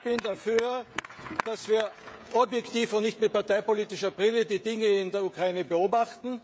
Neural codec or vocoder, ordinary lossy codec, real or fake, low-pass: codec, 16 kHz, 16 kbps, FreqCodec, smaller model; none; fake; none